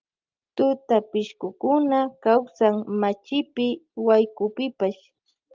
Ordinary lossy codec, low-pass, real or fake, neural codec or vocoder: Opus, 24 kbps; 7.2 kHz; real; none